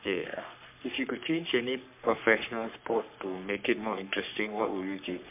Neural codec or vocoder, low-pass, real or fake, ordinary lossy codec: codec, 44.1 kHz, 3.4 kbps, Pupu-Codec; 3.6 kHz; fake; MP3, 32 kbps